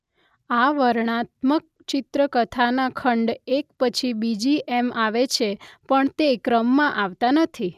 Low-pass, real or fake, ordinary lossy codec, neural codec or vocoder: 14.4 kHz; real; none; none